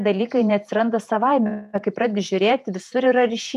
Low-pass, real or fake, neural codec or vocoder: 14.4 kHz; fake; vocoder, 48 kHz, 128 mel bands, Vocos